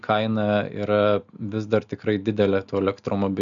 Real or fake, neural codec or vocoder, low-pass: real; none; 7.2 kHz